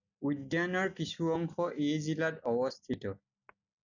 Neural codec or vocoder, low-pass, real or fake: none; 7.2 kHz; real